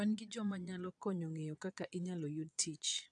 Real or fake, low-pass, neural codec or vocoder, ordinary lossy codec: fake; 9.9 kHz; vocoder, 22.05 kHz, 80 mel bands, Vocos; AAC, 64 kbps